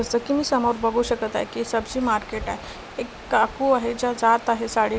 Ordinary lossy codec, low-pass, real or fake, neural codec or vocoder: none; none; real; none